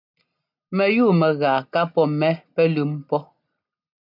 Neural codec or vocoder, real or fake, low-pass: none; real; 5.4 kHz